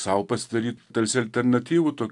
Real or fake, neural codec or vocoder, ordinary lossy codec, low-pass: real; none; AAC, 64 kbps; 10.8 kHz